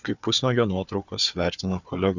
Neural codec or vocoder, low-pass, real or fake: codec, 16 kHz, 4 kbps, FunCodec, trained on Chinese and English, 50 frames a second; 7.2 kHz; fake